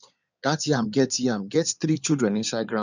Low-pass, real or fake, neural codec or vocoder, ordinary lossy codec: 7.2 kHz; fake; codec, 16 kHz in and 24 kHz out, 2.2 kbps, FireRedTTS-2 codec; none